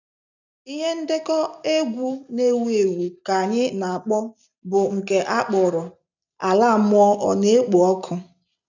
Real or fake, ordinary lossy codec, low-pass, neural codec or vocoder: real; none; 7.2 kHz; none